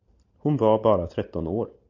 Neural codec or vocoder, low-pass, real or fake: none; 7.2 kHz; real